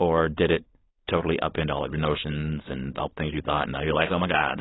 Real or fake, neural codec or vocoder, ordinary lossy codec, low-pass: fake; codec, 16 kHz, 4.8 kbps, FACodec; AAC, 16 kbps; 7.2 kHz